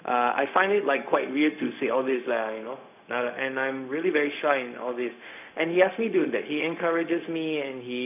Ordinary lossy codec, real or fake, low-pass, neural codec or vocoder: none; fake; 3.6 kHz; codec, 16 kHz, 0.4 kbps, LongCat-Audio-Codec